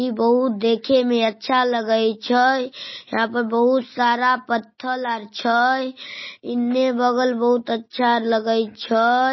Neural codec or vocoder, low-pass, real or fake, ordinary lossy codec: none; 7.2 kHz; real; MP3, 24 kbps